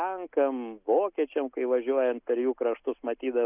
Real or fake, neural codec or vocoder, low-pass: real; none; 3.6 kHz